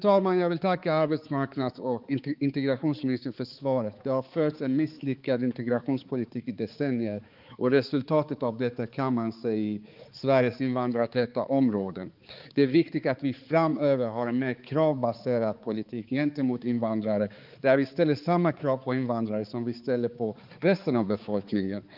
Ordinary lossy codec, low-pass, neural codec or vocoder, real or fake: Opus, 32 kbps; 5.4 kHz; codec, 16 kHz, 4 kbps, X-Codec, HuBERT features, trained on balanced general audio; fake